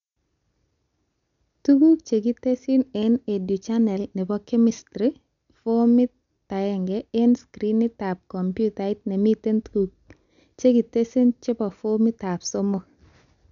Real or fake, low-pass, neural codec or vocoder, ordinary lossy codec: real; 7.2 kHz; none; none